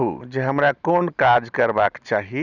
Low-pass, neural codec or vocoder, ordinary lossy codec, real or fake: 7.2 kHz; none; none; real